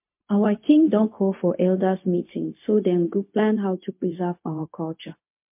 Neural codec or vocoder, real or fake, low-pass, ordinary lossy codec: codec, 16 kHz, 0.4 kbps, LongCat-Audio-Codec; fake; 3.6 kHz; MP3, 32 kbps